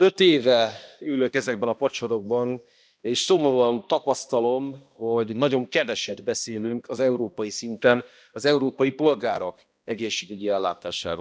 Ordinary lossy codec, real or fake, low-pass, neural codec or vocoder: none; fake; none; codec, 16 kHz, 1 kbps, X-Codec, HuBERT features, trained on balanced general audio